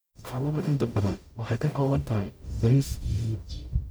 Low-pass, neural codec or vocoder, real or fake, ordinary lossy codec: none; codec, 44.1 kHz, 0.9 kbps, DAC; fake; none